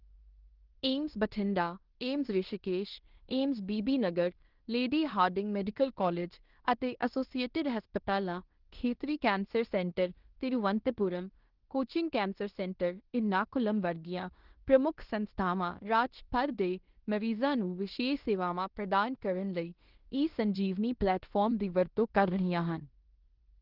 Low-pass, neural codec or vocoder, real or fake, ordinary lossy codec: 5.4 kHz; codec, 16 kHz in and 24 kHz out, 0.9 kbps, LongCat-Audio-Codec, fine tuned four codebook decoder; fake; Opus, 16 kbps